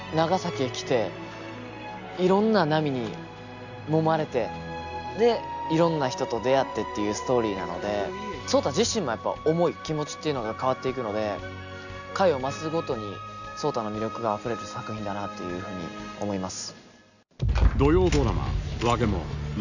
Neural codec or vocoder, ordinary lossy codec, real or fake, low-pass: none; none; real; 7.2 kHz